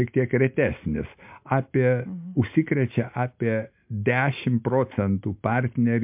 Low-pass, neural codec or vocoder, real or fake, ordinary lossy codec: 3.6 kHz; none; real; MP3, 32 kbps